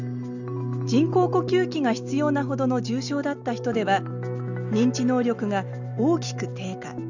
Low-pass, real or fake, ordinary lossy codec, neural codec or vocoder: 7.2 kHz; real; none; none